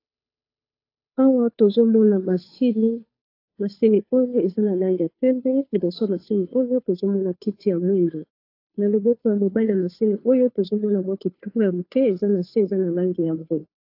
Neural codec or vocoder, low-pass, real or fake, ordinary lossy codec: codec, 16 kHz, 2 kbps, FunCodec, trained on Chinese and English, 25 frames a second; 5.4 kHz; fake; AAC, 32 kbps